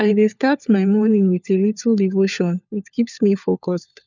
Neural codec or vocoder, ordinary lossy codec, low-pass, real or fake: codec, 16 kHz, 4 kbps, FunCodec, trained on LibriTTS, 50 frames a second; none; 7.2 kHz; fake